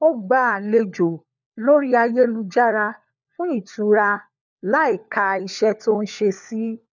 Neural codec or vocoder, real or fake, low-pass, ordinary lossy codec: codec, 16 kHz, 4 kbps, FunCodec, trained on LibriTTS, 50 frames a second; fake; 7.2 kHz; none